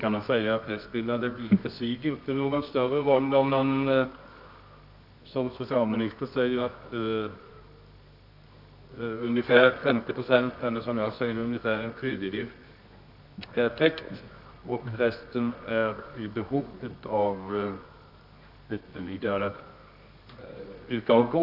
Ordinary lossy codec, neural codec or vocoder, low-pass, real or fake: none; codec, 24 kHz, 0.9 kbps, WavTokenizer, medium music audio release; 5.4 kHz; fake